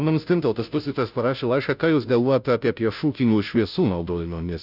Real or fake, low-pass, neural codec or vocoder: fake; 5.4 kHz; codec, 16 kHz, 0.5 kbps, FunCodec, trained on Chinese and English, 25 frames a second